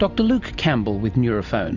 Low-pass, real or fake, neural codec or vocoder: 7.2 kHz; real; none